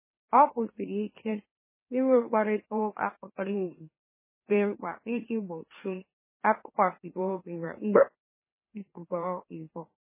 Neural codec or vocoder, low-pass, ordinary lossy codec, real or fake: autoencoder, 44.1 kHz, a latent of 192 numbers a frame, MeloTTS; 3.6 kHz; MP3, 16 kbps; fake